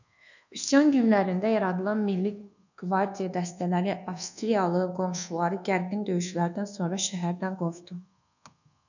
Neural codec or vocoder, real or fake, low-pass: codec, 24 kHz, 1.2 kbps, DualCodec; fake; 7.2 kHz